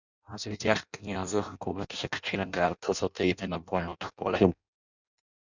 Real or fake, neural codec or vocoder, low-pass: fake; codec, 16 kHz in and 24 kHz out, 0.6 kbps, FireRedTTS-2 codec; 7.2 kHz